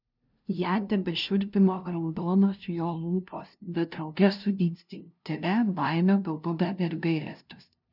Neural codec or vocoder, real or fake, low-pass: codec, 16 kHz, 0.5 kbps, FunCodec, trained on LibriTTS, 25 frames a second; fake; 5.4 kHz